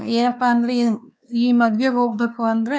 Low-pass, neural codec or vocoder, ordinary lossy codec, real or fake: none; codec, 16 kHz, 2 kbps, X-Codec, WavLM features, trained on Multilingual LibriSpeech; none; fake